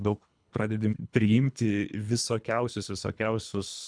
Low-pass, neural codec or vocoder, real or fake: 9.9 kHz; codec, 24 kHz, 3 kbps, HILCodec; fake